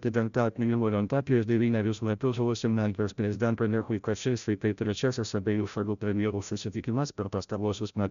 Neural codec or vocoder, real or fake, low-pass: codec, 16 kHz, 0.5 kbps, FreqCodec, larger model; fake; 7.2 kHz